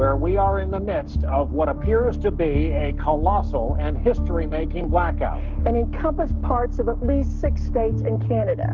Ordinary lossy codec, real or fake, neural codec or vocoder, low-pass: Opus, 24 kbps; fake; codec, 44.1 kHz, 7.8 kbps, Pupu-Codec; 7.2 kHz